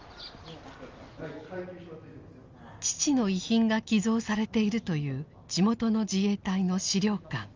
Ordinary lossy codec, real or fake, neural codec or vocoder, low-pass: Opus, 32 kbps; real; none; 7.2 kHz